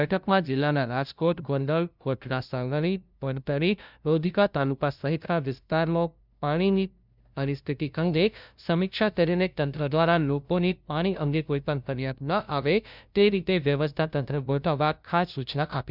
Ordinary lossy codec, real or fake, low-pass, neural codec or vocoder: none; fake; 5.4 kHz; codec, 16 kHz, 0.5 kbps, FunCodec, trained on LibriTTS, 25 frames a second